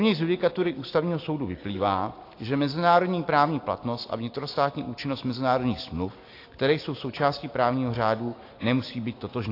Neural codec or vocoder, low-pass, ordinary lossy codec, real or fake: none; 5.4 kHz; AAC, 32 kbps; real